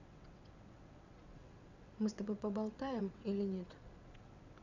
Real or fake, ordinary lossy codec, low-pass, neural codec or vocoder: fake; none; 7.2 kHz; vocoder, 22.05 kHz, 80 mel bands, WaveNeXt